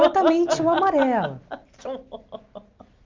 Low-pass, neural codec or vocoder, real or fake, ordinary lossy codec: 7.2 kHz; none; real; Opus, 32 kbps